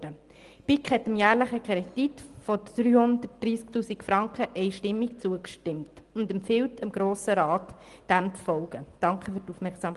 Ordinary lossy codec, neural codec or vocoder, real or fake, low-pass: Opus, 24 kbps; none; real; 10.8 kHz